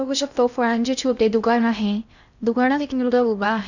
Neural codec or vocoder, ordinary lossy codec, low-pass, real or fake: codec, 16 kHz in and 24 kHz out, 0.6 kbps, FocalCodec, streaming, 4096 codes; none; 7.2 kHz; fake